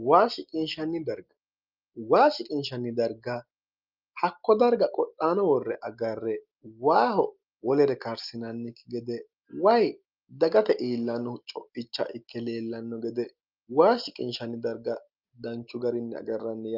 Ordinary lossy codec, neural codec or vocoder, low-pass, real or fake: Opus, 24 kbps; none; 5.4 kHz; real